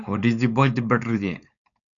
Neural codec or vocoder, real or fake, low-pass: codec, 16 kHz, 4.8 kbps, FACodec; fake; 7.2 kHz